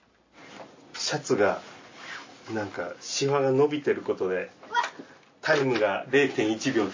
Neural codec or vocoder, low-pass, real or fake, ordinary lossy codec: none; 7.2 kHz; real; MP3, 32 kbps